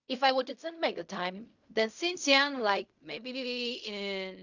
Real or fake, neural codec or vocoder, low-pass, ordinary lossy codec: fake; codec, 16 kHz in and 24 kHz out, 0.4 kbps, LongCat-Audio-Codec, fine tuned four codebook decoder; 7.2 kHz; none